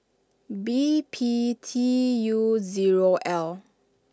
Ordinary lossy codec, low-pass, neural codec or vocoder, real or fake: none; none; none; real